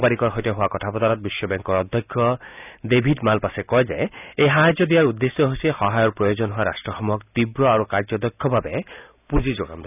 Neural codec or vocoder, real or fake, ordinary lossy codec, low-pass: none; real; none; 3.6 kHz